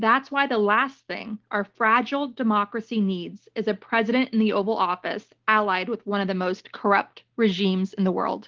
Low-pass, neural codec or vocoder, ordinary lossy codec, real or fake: 7.2 kHz; none; Opus, 16 kbps; real